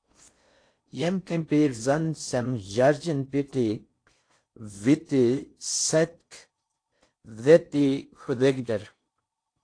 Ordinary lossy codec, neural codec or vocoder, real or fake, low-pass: AAC, 48 kbps; codec, 16 kHz in and 24 kHz out, 0.6 kbps, FocalCodec, streaming, 4096 codes; fake; 9.9 kHz